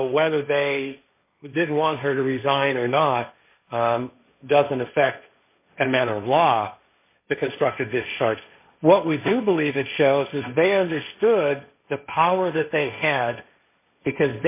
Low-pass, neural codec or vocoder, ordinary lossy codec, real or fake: 3.6 kHz; codec, 16 kHz, 1.1 kbps, Voila-Tokenizer; MP3, 24 kbps; fake